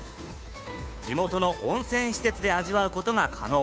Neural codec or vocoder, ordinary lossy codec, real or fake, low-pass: codec, 16 kHz, 2 kbps, FunCodec, trained on Chinese and English, 25 frames a second; none; fake; none